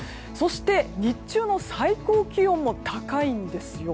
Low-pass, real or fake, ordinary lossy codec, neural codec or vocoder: none; real; none; none